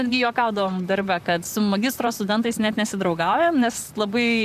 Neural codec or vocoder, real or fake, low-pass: vocoder, 44.1 kHz, 128 mel bands, Pupu-Vocoder; fake; 14.4 kHz